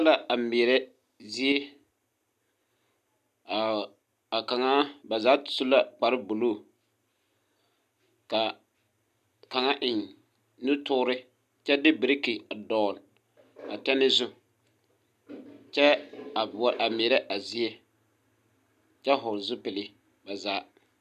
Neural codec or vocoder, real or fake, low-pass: none; real; 14.4 kHz